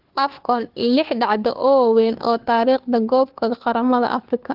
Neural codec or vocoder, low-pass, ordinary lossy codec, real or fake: codec, 16 kHz, 2 kbps, FunCodec, trained on Chinese and English, 25 frames a second; 5.4 kHz; Opus, 16 kbps; fake